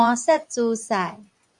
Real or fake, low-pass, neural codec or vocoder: fake; 10.8 kHz; vocoder, 24 kHz, 100 mel bands, Vocos